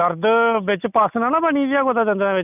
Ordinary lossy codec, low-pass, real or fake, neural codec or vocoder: none; 3.6 kHz; real; none